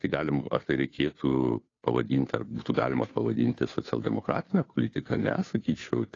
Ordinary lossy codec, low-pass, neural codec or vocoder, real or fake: AAC, 32 kbps; 7.2 kHz; codec, 16 kHz, 2 kbps, FunCodec, trained on Chinese and English, 25 frames a second; fake